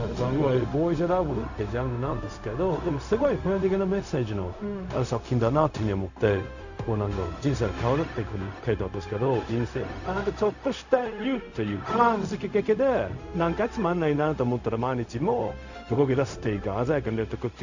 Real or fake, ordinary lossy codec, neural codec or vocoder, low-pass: fake; none; codec, 16 kHz, 0.4 kbps, LongCat-Audio-Codec; 7.2 kHz